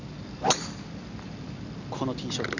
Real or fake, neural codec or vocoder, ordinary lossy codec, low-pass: real; none; none; 7.2 kHz